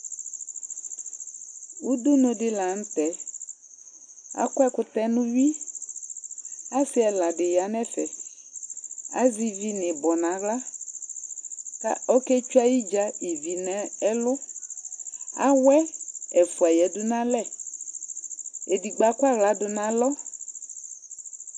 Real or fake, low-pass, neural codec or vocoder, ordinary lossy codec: real; 9.9 kHz; none; AAC, 64 kbps